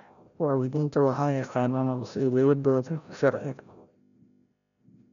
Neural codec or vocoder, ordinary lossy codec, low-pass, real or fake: codec, 16 kHz, 0.5 kbps, FreqCodec, larger model; none; 7.2 kHz; fake